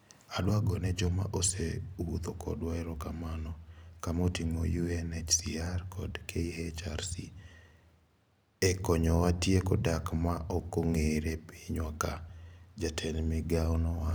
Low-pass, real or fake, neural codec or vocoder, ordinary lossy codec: none; fake; vocoder, 44.1 kHz, 128 mel bands every 512 samples, BigVGAN v2; none